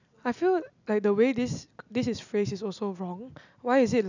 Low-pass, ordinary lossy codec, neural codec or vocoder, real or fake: 7.2 kHz; none; none; real